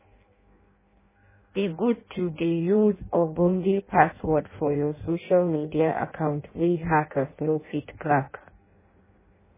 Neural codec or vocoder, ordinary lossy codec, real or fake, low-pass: codec, 16 kHz in and 24 kHz out, 0.6 kbps, FireRedTTS-2 codec; MP3, 16 kbps; fake; 3.6 kHz